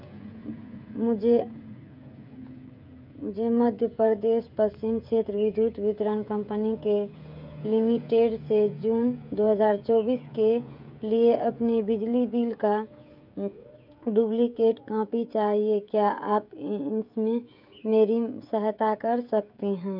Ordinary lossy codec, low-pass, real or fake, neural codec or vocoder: none; 5.4 kHz; fake; codec, 16 kHz, 16 kbps, FreqCodec, smaller model